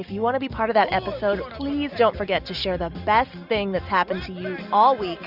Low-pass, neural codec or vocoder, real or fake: 5.4 kHz; none; real